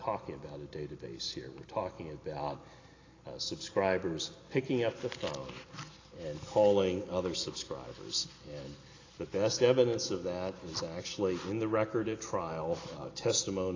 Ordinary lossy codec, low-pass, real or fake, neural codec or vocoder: AAC, 32 kbps; 7.2 kHz; real; none